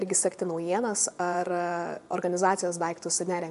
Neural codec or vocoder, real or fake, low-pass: vocoder, 24 kHz, 100 mel bands, Vocos; fake; 10.8 kHz